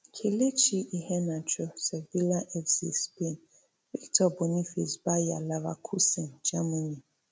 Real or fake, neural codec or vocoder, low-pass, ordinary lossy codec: real; none; none; none